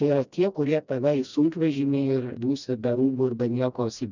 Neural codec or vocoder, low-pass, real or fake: codec, 16 kHz, 1 kbps, FreqCodec, smaller model; 7.2 kHz; fake